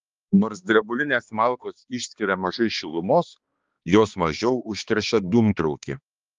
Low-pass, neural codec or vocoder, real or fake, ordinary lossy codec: 7.2 kHz; codec, 16 kHz, 2 kbps, X-Codec, HuBERT features, trained on balanced general audio; fake; Opus, 32 kbps